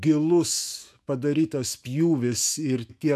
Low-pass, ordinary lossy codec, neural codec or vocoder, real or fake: 14.4 kHz; MP3, 96 kbps; autoencoder, 48 kHz, 128 numbers a frame, DAC-VAE, trained on Japanese speech; fake